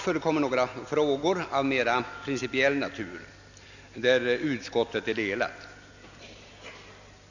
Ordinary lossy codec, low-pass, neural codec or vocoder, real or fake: none; 7.2 kHz; none; real